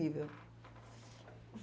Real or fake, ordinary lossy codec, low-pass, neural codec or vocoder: real; none; none; none